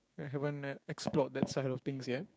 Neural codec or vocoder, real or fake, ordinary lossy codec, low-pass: codec, 16 kHz, 6 kbps, DAC; fake; none; none